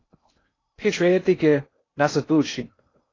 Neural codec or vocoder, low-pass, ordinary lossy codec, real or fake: codec, 16 kHz in and 24 kHz out, 0.6 kbps, FocalCodec, streaming, 2048 codes; 7.2 kHz; AAC, 32 kbps; fake